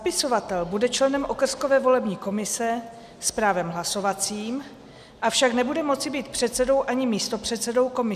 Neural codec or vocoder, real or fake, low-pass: none; real; 14.4 kHz